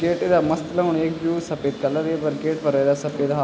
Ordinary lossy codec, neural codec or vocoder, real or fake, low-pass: none; none; real; none